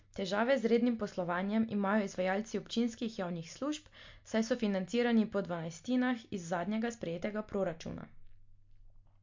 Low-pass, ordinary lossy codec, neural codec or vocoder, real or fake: 7.2 kHz; MP3, 48 kbps; none; real